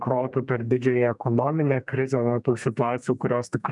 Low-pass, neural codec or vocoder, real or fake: 10.8 kHz; codec, 32 kHz, 1.9 kbps, SNAC; fake